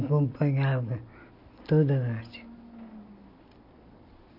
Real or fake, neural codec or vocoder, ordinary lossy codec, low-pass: real; none; none; 5.4 kHz